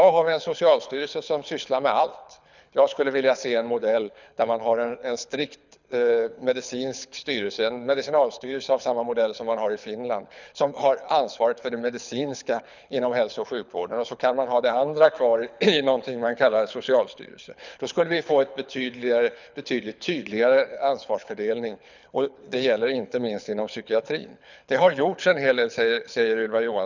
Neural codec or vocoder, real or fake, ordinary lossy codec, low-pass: codec, 24 kHz, 6 kbps, HILCodec; fake; none; 7.2 kHz